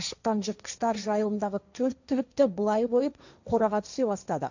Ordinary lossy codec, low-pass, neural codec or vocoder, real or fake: none; 7.2 kHz; codec, 16 kHz, 1.1 kbps, Voila-Tokenizer; fake